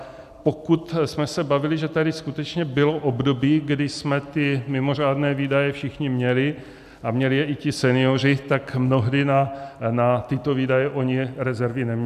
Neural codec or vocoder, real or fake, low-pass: none; real; 14.4 kHz